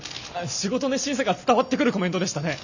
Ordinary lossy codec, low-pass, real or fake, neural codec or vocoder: none; 7.2 kHz; real; none